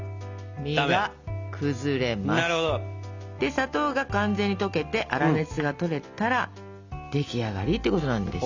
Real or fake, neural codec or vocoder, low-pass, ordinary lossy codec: real; none; 7.2 kHz; Opus, 64 kbps